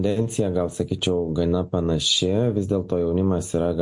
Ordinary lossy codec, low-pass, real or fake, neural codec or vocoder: MP3, 48 kbps; 10.8 kHz; real; none